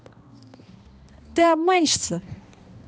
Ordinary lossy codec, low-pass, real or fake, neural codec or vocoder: none; none; fake; codec, 16 kHz, 1 kbps, X-Codec, HuBERT features, trained on balanced general audio